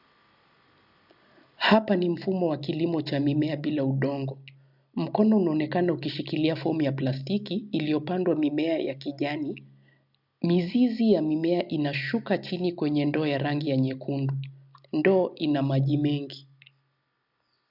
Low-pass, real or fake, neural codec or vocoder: 5.4 kHz; real; none